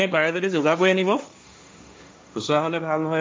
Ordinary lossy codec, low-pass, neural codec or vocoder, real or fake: none; none; codec, 16 kHz, 1.1 kbps, Voila-Tokenizer; fake